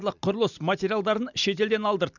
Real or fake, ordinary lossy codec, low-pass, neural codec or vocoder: real; none; 7.2 kHz; none